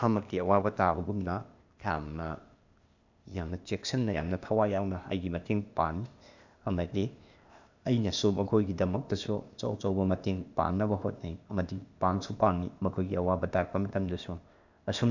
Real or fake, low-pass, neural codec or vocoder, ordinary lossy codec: fake; 7.2 kHz; codec, 16 kHz, 0.8 kbps, ZipCodec; none